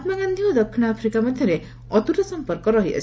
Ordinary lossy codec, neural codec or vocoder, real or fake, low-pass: none; none; real; none